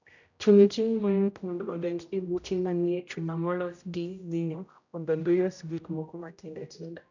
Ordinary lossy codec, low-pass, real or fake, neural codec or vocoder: none; 7.2 kHz; fake; codec, 16 kHz, 0.5 kbps, X-Codec, HuBERT features, trained on general audio